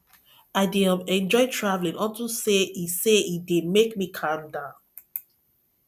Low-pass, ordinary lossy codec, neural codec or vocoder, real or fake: 14.4 kHz; none; none; real